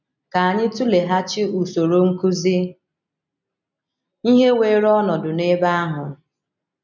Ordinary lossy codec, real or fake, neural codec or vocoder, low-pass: none; real; none; 7.2 kHz